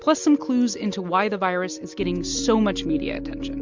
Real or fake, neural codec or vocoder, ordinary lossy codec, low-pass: real; none; MP3, 64 kbps; 7.2 kHz